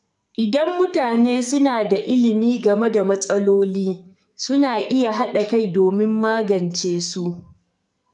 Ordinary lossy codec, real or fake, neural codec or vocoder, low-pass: none; fake; codec, 44.1 kHz, 2.6 kbps, SNAC; 10.8 kHz